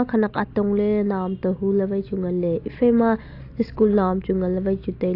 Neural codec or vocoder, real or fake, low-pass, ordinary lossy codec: none; real; 5.4 kHz; AAC, 32 kbps